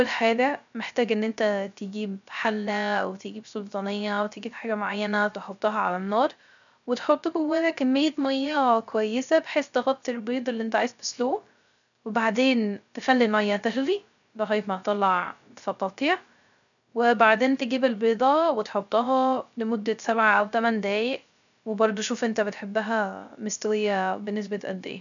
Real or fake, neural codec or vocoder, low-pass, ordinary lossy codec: fake; codec, 16 kHz, 0.3 kbps, FocalCodec; 7.2 kHz; none